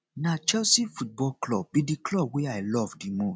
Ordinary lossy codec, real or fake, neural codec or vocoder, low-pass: none; real; none; none